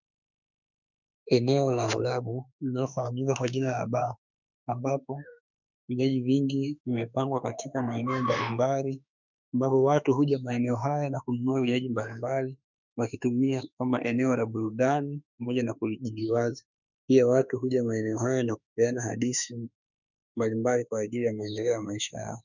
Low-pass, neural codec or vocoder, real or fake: 7.2 kHz; autoencoder, 48 kHz, 32 numbers a frame, DAC-VAE, trained on Japanese speech; fake